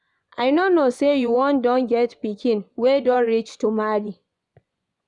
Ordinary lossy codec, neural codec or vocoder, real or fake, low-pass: none; vocoder, 24 kHz, 100 mel bands, Vocos; fake; 10.8 kHz